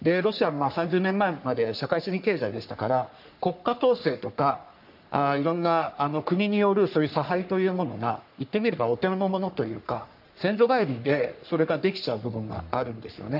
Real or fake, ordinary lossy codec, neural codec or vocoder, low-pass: fake; none; codec, 44.1 kHz, 3.4 kbps, Pupu-Codec; 5.4 kHz